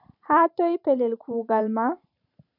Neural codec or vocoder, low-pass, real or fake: vocoder, 44.1 kHz, 128 mel bands every 512 samples, BigVGAN v2; 5.4 kHz; fake